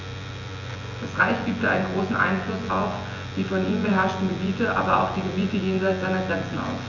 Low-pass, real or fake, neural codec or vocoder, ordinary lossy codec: 7.2 kHz; fake; vocoder, 24 kHz, 100 mel bands, Vocos; none